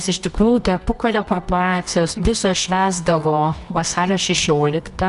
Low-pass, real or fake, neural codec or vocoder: 10.8 kHz; fake; codec, 24 kHz, 0.9 kbps, WavTokenizer, medium music audio release